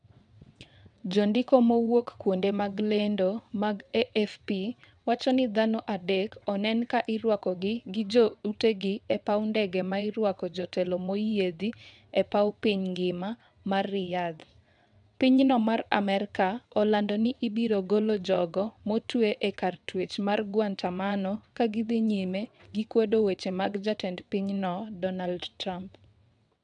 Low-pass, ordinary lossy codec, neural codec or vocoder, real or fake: 9.9 kHz; none; vocoder, 22.05 kHz, 80 mel bands, WaveNeXt; fake